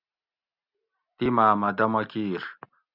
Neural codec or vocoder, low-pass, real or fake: none; 5.4 kHz; real